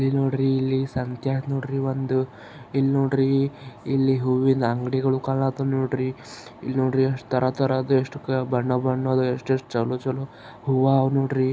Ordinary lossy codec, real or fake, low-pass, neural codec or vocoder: none; real; none; none